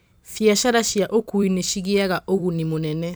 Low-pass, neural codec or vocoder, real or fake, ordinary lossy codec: none; vocoder, 44.1 kHz, 128 mel bands every 256 samples, BigVGAN v2; fake; none